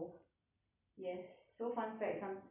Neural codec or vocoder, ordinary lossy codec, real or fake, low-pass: none; none; real; 3.6 kHz